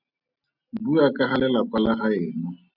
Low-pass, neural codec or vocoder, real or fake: 5.4 kHz; none; real